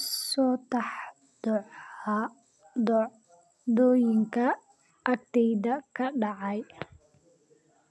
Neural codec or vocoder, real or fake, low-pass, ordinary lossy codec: none; real; none; none